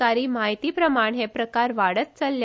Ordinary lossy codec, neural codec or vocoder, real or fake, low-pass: none; none; real; none